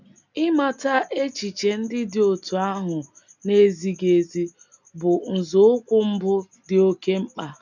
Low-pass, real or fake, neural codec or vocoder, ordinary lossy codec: 7.2 kHz; real; none; none